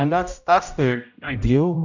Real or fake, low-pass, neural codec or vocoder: fake; 7.2 kHz; codec, 16 kHz, 0.5 kbps, X-Codec, HuBERT features, trained on general audio